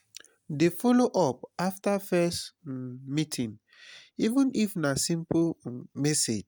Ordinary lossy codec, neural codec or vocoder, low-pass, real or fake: none; none; none; real